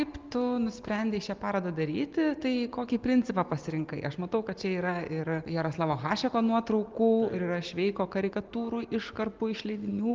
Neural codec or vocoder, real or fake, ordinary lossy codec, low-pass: none; real; Opus, 24 kbps; 7.2 kHz